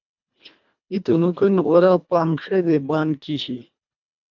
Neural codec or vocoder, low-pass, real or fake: codec, 24 kHz, 1.5 kbps, HILCodec; 7.2 kHz; fake